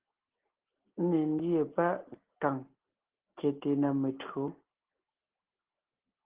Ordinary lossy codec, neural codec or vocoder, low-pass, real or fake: Opus, 16 kbps; none; 3.6 kHz; real